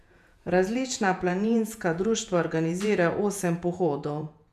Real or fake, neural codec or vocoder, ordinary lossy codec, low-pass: fake; vocoder, 48 kHz, 128 mel bands, Vocos; none; 14.4 kHz